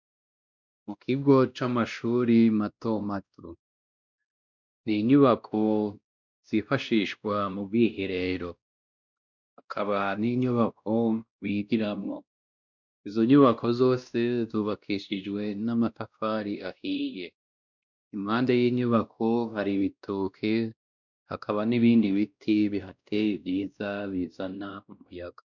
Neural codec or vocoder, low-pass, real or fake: codec, 16 kHz, 1 kbps, X-Codec, WavLM features, trained on Multilingual LibriSpeech; 7.2 kHz; fake